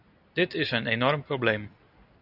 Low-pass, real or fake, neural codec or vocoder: 5.4 kHz; real; none